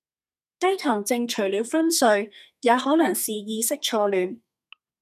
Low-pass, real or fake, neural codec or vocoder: 14.4 kHz; fake; codec, 32 kHz, 1.9 kbps, SNAC